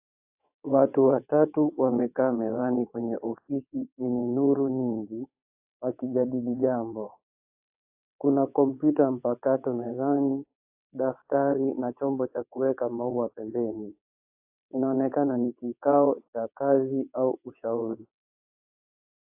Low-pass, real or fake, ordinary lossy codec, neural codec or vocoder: 3.6 kHz; fake; AAC, 32 kbps; vocoder, 22.05 kHz, 80 mel bands, WaveNeXt